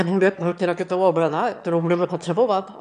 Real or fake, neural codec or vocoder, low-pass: fake; autoencoder, 22.05 kHz, a latent of 192 numbers a frame, VITS, trained on one speaker; 9.9 kHz